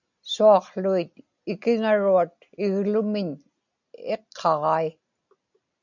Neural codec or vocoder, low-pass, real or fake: none; 7.2 kHz; real